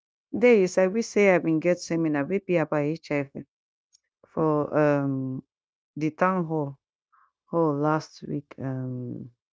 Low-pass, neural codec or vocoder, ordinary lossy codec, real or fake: none; codec, 16 kHz, 0.9 kbps, LongCat-Audio-Codec; none; fake